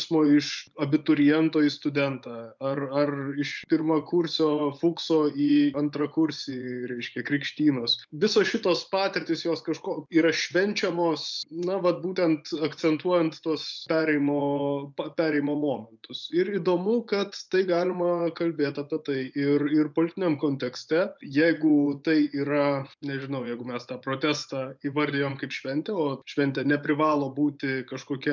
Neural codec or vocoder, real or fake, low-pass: vocoder, 24 kHz, 100 mel bands, Vocos; fake; 7.2 kHz